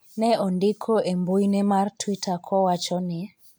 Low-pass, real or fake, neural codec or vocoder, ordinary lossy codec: none; real; none; none